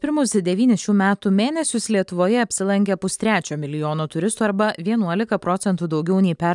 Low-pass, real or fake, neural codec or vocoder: 10.8 kHz; real; none